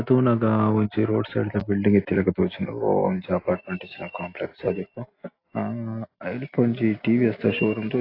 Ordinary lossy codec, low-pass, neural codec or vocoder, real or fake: AAC, 32 kbps; 5.4 kHz; none; real